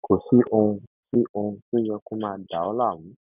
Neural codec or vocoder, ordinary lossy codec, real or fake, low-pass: none; none; real; 3.6 kHz